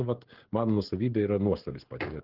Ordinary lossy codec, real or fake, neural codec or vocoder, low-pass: Opus, 16 kbps; fake; codec, 16 kHz, 16 kbps, FreqCodec, smaller model; 5.4 kHz